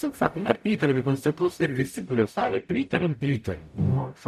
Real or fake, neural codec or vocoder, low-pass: fake; codec, 44.1 kHz, 0.9 kbps, DAC; 14.4 kHz